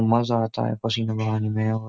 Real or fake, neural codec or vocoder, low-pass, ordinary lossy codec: real; none; none; none